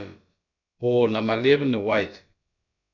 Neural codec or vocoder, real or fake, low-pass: codec, 16 kHz, about 1 kbps, DyCAST, with the encoder's durations; fake; 7.2 kHz